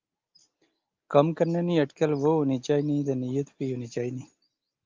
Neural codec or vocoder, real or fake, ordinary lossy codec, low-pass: none; real; Opus, 24 kbps; 7.2 kHz